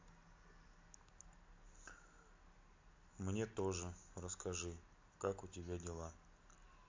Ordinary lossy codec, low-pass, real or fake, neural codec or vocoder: MP3, 48 kbps; 7.2 kHz; real; none